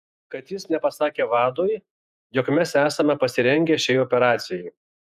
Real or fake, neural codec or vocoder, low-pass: fake; vocoder, 48 kHz, 128 mel bands, Vocos; 14.4 kHz